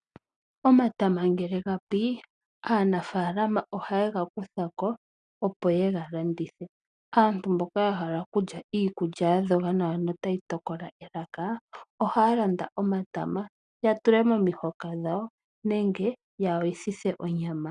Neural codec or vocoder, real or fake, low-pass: none; real; 9.9 kHz